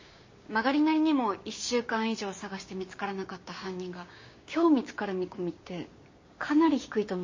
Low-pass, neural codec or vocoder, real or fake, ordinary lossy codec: 7.2 kHz; codec, 16 kHz, 6 kbps, DAC; fake; MP3, 32 kbps